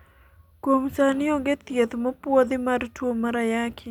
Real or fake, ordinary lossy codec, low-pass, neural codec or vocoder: real; none; 19.8 kHz; none